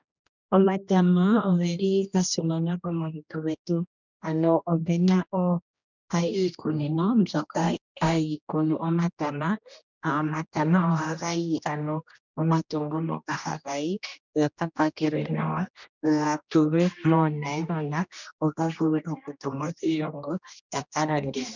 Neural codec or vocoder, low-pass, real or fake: codec, 16 kHz, 1 kbps, X-Codec, HuBERT features, trained on general audio; 7.2 kHz; fake